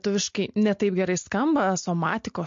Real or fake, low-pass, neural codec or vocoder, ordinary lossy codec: real; 7.2 kHz; none; MP3, 48 kbps